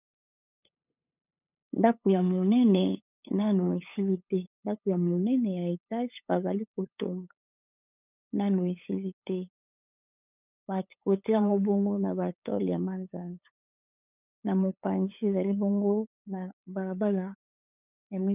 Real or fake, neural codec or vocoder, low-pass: fake; codec, 16 kHz, 8 kbps, FunCodec, trained on LibriTTS, 25 frames a second; 3.6 kHz